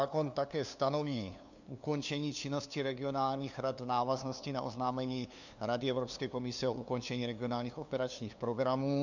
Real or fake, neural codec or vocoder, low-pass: fake; codec, 16 kHz, 2 kbps, FunCodec, trained on LibriTTS, 25 frames a second; 7.2 kHz